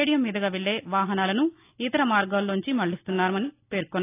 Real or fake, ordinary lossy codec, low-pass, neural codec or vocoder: real; AAC, 24 kbps; 3.6 kHz; none